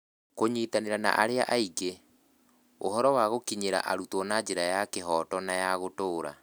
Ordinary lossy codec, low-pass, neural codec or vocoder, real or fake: none; none; none; real